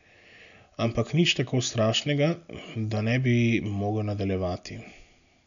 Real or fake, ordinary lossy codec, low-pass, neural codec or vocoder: real; none; 7.2 kHz; none